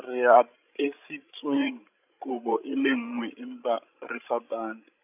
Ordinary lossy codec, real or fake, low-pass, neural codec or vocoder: AAC, 32 kbps; fake; 3.6 kHz; codec, 16 kHz, 16 kbps, FreqCodec, larger model